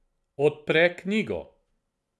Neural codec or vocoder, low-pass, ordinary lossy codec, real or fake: none; none; none; real